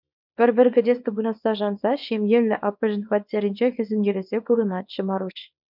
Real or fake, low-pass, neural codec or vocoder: fake; 5.4 kHz; codec, 24 kHz, 0.9 kbps, WavTokenizer, small release